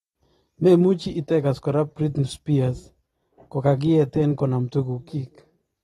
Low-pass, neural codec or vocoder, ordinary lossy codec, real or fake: 19.8 kHz; none; AAC, 32 kbps; real